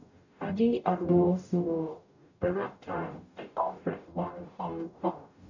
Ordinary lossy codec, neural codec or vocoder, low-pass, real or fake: none; codec, 44.1 kHz, 0.9 kbps, DAC; 7.2 kHz; fake